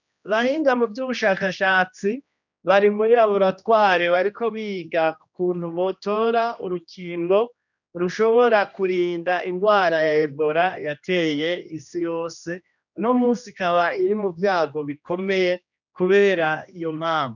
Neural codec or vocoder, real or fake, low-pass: codec, 16 kHz, 1 kbps, X-Codec, HuBERT features, trained on general audio; fake; 7.2 kHz